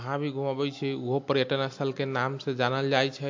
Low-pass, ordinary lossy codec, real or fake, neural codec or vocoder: 7.2 kHz; MP3, 48 kbps; real; none